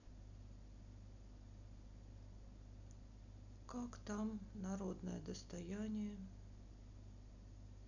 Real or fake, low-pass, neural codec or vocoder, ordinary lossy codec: real; 7.2 kHz; none; none